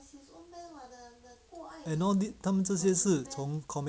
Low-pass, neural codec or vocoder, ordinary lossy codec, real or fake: none; none; none; real